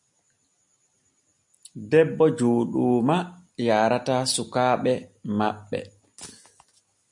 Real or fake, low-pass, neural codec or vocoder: real; 10.8 kHz; none